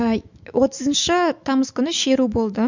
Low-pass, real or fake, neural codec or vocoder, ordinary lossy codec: 7.2 kHz; fake; autoencoder, 48 kHz, 128 numbers a frame, DAC-VAE, trained on Japanese speech; Opus, 64 kbps